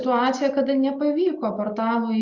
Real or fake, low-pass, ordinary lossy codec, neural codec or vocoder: real; 7.2 kHz; Opus, 64 kbps; none